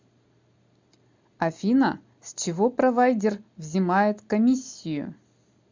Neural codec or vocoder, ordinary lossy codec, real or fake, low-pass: none; MP3, 64 kbps; real; 7.2 kHz